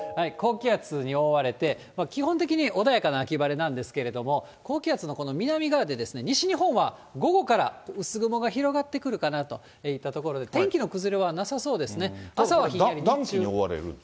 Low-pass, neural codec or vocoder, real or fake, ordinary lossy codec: none; none; real; none